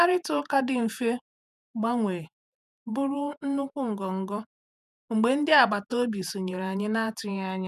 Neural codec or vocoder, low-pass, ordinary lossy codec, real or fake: vocoder, 48 kHz, 128 mel bands, Vocos; 14.4 kHz; none; fake